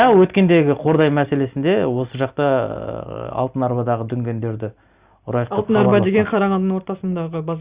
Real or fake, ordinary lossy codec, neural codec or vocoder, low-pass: real; Opus, 64 kbps; none; 3.6 kHz